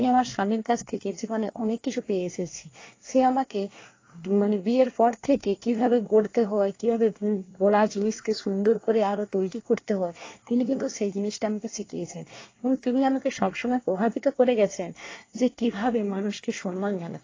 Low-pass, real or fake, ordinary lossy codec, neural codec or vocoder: 7.2 kHz; fake; AAC, 32 kbps; codec, 24 kHz, 1 kbps, SNAC